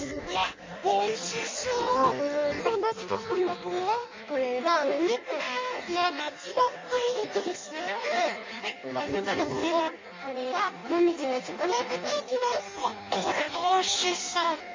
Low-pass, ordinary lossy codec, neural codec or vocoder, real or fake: 7.2 kHz; MP3, 32 kbps; codec, 16 kHz in and 24 kHz out, 0.6 kbps, FireRedTTS-2 codec; fake